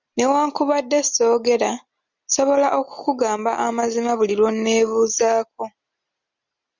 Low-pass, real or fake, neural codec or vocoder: 7.2 kHz; real; none